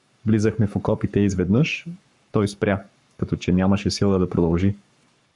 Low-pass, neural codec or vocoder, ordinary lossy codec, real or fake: 10.8 kHz; codec, 44.1 kHz, 7.8 kbps, Pupu-Codec; MP3, 96 kbps; fake